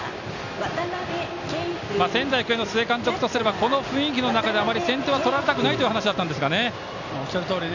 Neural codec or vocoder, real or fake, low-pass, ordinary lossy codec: none; real; 7.2 kHz; none